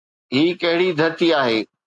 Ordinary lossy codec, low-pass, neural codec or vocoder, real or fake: AAC, 64 kbps; 10.8 kHz; none; real